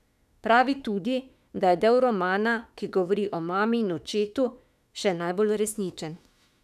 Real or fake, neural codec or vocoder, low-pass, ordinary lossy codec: fake; autoencoder, 48 kHz, 32 numbers a frame, DAC-VAE, trained on Japanese speech; 14.4 kHz; none